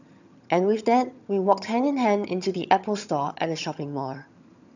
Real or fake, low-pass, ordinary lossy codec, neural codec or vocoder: fake; 7.2 kHz; none; vocoder, 22.05 kHz, 80 mel bands, HiFi-GAN